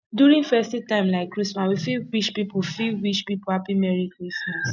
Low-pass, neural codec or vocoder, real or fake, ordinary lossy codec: 7.2 kHz; none; real; none